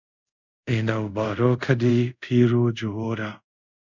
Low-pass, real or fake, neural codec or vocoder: 7.2 kHz; fake; codec, 24 kHz, 0.5 kbps, DualCodec